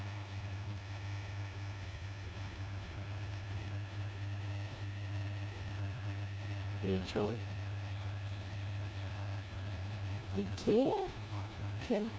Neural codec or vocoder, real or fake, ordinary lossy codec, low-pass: codec, 16 kHz, 1 kbps, FunCodec, trained on LibriTTS, 50 frames a second; fake; none; none